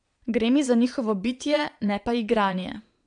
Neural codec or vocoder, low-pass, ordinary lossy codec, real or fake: vocoder, 22.05 kHz, 80 mel bands, Vocos; 9.9 kHz; AAC, 64 kbps; fake